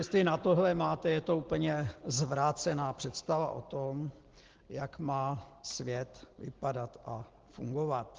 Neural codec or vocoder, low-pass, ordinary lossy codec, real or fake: none; 7.2 kHz; Opus, 16 kbps; real